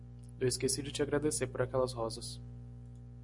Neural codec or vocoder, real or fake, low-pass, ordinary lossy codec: none; real; 10.8 kHz; MP3, 96 kbps